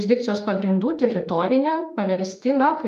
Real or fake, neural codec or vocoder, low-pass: fake; autoencoder, 48 kHz, 32 numbers a frame, DAC-VAE, trained on Japanese speech; 14.4 kHz